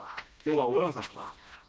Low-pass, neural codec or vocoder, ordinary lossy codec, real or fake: none; codec, 16 kHz, 1 kbps, FreqCodec, smaller model; none; fake